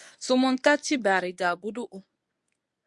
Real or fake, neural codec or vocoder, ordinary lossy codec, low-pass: fake; codec, 24 kHz, 0.9 kbps, WavTokenizer, medium speech release version 1; Opus, 64 kbps; 10.8 kHz